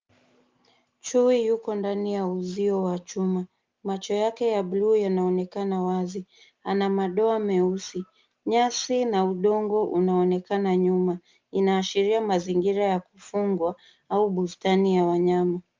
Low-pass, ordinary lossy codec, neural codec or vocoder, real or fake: 7.2 kHz; Opus, 32 kbps; none; real